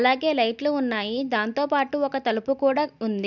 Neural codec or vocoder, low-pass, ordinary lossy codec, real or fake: none; 7.2 kHz; none; real